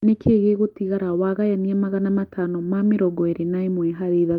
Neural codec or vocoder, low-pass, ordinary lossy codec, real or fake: none; 19.8 kHz; Opus, 32 kbps; real